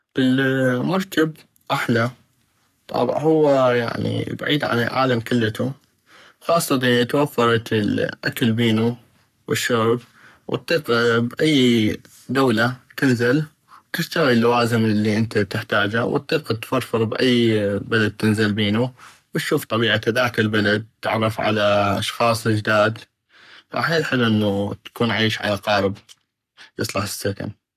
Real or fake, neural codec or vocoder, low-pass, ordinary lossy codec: fake; codec, 44.1 kHz, 3.4 kbps, Pupu-Codec; 14.4 kHz; none